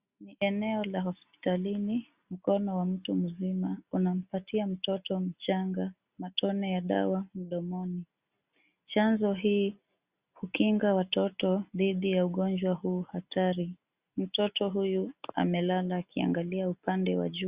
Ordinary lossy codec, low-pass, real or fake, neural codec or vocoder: Opus, 64 kbps; 3.6 kHz; real; none